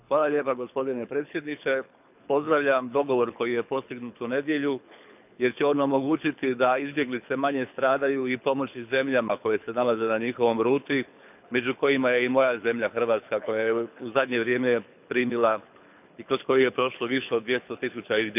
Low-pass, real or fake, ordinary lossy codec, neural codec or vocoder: 3.6 kHz; fake; none; codec, 24 kHz, 6 kbps, HILCodec